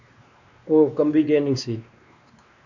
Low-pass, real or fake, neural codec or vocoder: 7.2 kHz; fake; codec, 16 kHz, 2 kbps, X-Codec, WavLM features, trained on Multilingual LibriSpeech